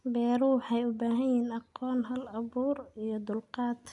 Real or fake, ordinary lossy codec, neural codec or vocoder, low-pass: real; none; none; 10.8 kHz